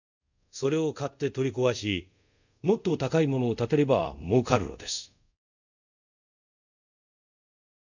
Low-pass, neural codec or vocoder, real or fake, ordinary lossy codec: 7.2 kHz; codec, 24 kHz, 0.5 kbps, DualCodec; fake; none